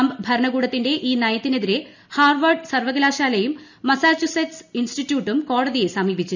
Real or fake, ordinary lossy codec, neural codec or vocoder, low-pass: real; none; none; 7.2 kHz